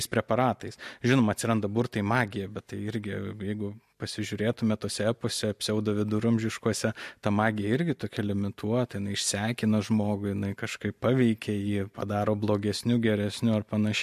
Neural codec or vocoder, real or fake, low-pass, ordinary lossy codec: none; real; 14.4 kHz; MP3, 64 kbps